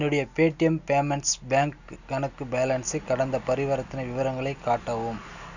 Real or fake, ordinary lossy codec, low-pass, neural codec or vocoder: real; none; 7.2 kHz; none